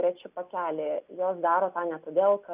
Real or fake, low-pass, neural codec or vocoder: real; 3.6 kHz; none